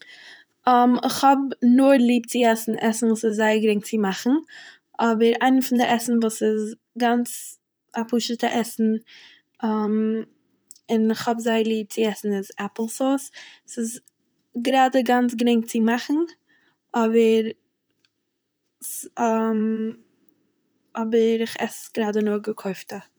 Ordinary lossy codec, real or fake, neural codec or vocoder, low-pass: none; fake; vocoder, 44.1 kHz, 128 mel bands, Pupu-Vocoder; none